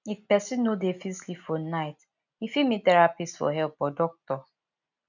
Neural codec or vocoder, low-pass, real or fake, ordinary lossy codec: none; 7.2 kHz; real; none